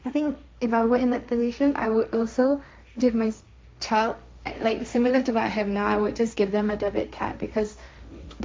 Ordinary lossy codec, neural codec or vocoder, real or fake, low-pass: none; codec, 16 kHz, 1.1 kbps, Voila-Tokenizer; fake; none